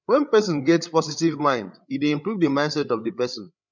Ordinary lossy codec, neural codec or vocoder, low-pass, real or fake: none; codec, 16 kHz, 8 kbps, FreqCodec, larger model; 7.2 kHz; fake